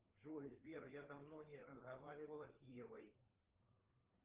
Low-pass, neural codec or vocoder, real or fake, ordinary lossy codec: 3.6 kHz; codec, 16 kHz, 4 kbps, FreqCodec, larger model; fake; Opus, 16 kbps